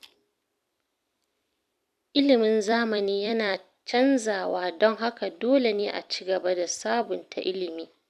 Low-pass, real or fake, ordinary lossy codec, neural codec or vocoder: 14.4 kHz; fake; none; vocoder, 44.1 kHz, 128 mel bands every 256 samples, BigVGAN v2